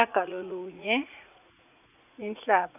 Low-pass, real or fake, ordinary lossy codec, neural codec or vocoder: 3.6 kHz; fake; none; vocoder, 44.1 kHz, 80 mel bands, Vocos